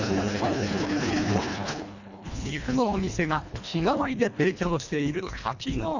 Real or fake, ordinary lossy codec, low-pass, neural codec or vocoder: fake; none; 7.2 kHz; codec, 24 kHz, 1.5 kbps, HILCodec